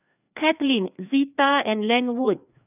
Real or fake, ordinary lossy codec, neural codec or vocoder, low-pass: fake; none; codec, 16 kHz, 2 kbps, FreqCodec, larger model; 3.6 kHz